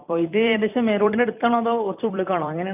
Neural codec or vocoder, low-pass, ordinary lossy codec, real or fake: vocoder, 44.1 kHz, 128 mel bands every 256 samples, BigVGAN v2; 3.6 kHz; none; fake